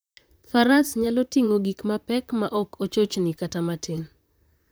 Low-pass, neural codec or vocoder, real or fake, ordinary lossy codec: none; vocoder, 44.1 kHz, 128 mel bands, Pupu-Vocoder; fake; none